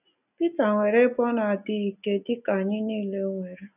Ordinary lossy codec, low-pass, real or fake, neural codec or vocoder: none; 3.6 kHz; real; none